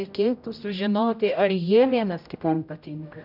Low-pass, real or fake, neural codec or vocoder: 5.4 kHz; fake; codec, 16 kHz, 0.5 kbps, X-Codec, HuBERT features, trained on general audio